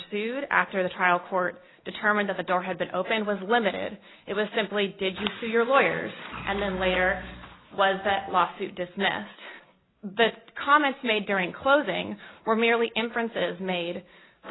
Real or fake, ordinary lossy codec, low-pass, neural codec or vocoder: real; AAC, 16 kbps; 7.2 kHz; none